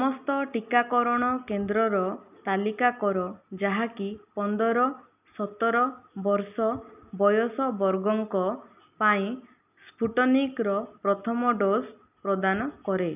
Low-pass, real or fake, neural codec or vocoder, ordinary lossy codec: 3.6 kHz; real; none; none